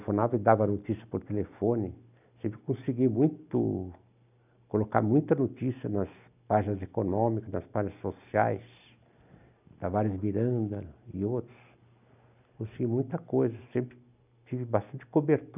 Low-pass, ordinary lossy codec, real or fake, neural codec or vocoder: 3.6 kHz; none; real; none